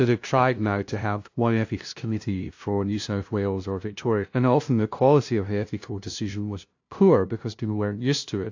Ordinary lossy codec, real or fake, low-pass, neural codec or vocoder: AAC, 48 kbps; fake; 7.2 kHz; codec, 16 kHz, 0.5 kbps, FunCodec, trained on LibriTTS, 25 frames a second